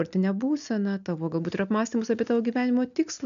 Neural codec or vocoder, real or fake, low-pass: none; real; 7.2 kHz